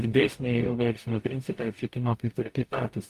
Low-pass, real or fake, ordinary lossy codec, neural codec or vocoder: 14.4 kHz; fake; Opus, 32 kbps; codec, 44.1 kHz, 0.9 kbps, DAC